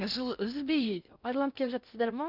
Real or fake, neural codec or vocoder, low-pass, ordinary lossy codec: fake; codec, 16 kHz in and 24 kHz out, 0.6 kbps, FocalCodec, streaming, 2048 codes; 5.4 kHz; none